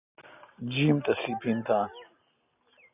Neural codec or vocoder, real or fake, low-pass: none; real; 3.6 kHz